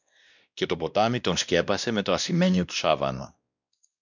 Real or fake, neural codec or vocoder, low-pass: fake; codec, 16 kHz, 1 kbps, X-Codec, WavLM features, trained on Multilingual LibriSpeech; 7.2 kHz